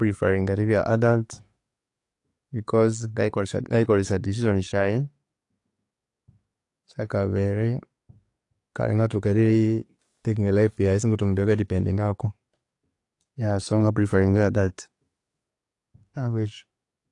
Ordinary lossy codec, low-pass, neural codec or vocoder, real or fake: AAC, 48 kbps; 10.8 kHz; codec, 44.1 kHz, 7.8 kbps, DAC; fake